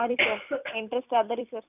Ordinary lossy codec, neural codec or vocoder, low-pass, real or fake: none; vocoder, 44.1 kHz, 128 mel bands every 256 samples, BigVGAN v2; 3.6 kHz; fake